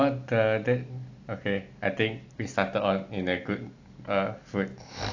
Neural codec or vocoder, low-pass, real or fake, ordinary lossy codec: none; 7.2 kHz; real; AAC, 48 kbps